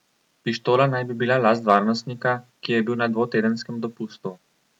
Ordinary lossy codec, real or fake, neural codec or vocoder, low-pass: none; real; none; 19.8 kHz